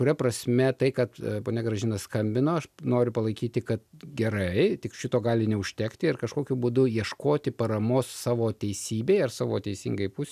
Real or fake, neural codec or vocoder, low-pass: real; none; 14.4 kHz